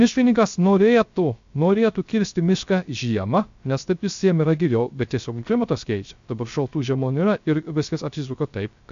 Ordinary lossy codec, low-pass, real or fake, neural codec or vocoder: MP3, 64 kbps; 7.2 kHz; fake; codec, 16 kHz, 0.3 kbps, FocalCodec